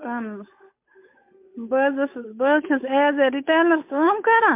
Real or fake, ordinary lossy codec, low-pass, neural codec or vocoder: fake; MP3, 32 kbps; 3.6 kHz; codec, 16 kHz, 8 kbps, FunCodec, trained on Chinese and English, 25 frames a second